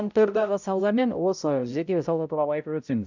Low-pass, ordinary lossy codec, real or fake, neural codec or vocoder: 7.2 kHz; none; fake; codec, 16 kHz, 0.5 kbps, X-Codec, HuBERT features, trained on balanced general audio